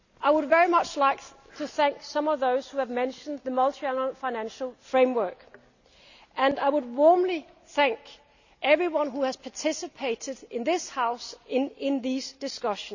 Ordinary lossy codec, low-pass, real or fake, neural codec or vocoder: none; 7.2 kHz; real; none